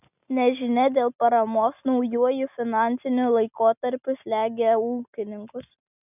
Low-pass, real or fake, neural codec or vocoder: 3.6 kHz; real; none